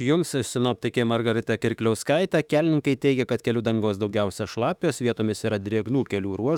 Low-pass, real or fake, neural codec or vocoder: 19.8 kHz; fake; autoencoder, 48 kHz, 32 numbers a frame, DAC-VAE, trained on Japanese speech